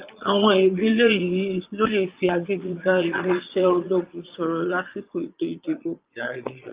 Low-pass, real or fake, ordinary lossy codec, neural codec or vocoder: 3.6 kHz; fake; Opus, 32 kbps; vocoder, 22.05 kHz, 80 mel bands, Vocos